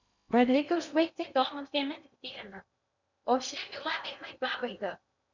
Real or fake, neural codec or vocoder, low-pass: fake; codec, 16 kHz in and 24 kHz out, 0.6 kbps, FocalCodec, streaming, 2048 codes; 7.2 kHz